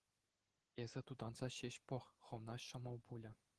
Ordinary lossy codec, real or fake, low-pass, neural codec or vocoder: Opus, 16 kbps; real; 10.8 kHz; none